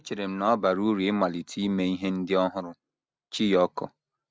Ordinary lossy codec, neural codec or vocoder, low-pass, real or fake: none; none; none; real